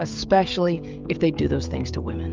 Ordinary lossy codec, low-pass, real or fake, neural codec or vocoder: Opus, 24 kbps; 7.2 kHz; fake; codec, 16 kHz, 16 kbps, FreqCodec, smaller model